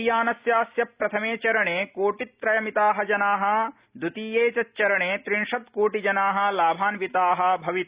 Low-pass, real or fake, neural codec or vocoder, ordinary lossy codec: 3.6 kHz; real; none; Opus, 64 kbps